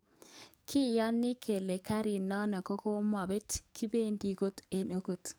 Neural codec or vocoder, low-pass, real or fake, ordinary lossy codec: codec, 44.1 kHz, 7.8 kbps, DAC; none; fake; none